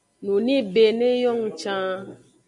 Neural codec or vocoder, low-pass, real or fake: none; 10.8 kHz; real